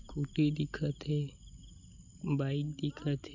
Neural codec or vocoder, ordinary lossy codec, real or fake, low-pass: none; none; real; 7.2 kHz